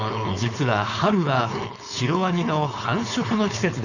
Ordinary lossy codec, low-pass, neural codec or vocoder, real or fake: none; 7.2 kHz; codec, 16 kHz, 4.8 kbps, FACodec; fake